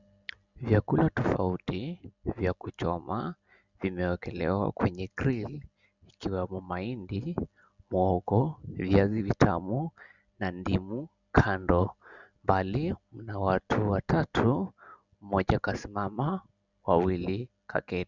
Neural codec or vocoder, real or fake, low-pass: none; real; 7.2 kHz